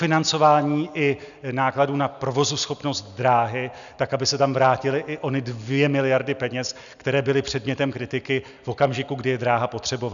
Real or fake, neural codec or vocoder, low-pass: real; none; 7.2 kHz